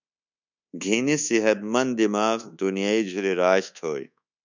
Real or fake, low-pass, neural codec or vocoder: fake; 7.2 kHz; codec, 24 kHz, 1.2 kbps, DualCodec